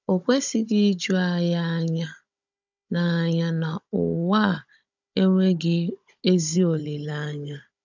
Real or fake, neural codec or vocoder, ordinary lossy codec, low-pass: fake; codec, 16 kHz, 16 kbps, FunCodec, trained on Chinese and English, 50 frames a second; none; 7.2 kHz